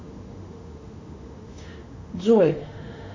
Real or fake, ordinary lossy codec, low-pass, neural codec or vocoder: fake; AAC, 48 kbps; 7.2 kHz; autoencoder, 48 kHz, 32 numbers a frame, DAC-VAE, trained on Japanese speech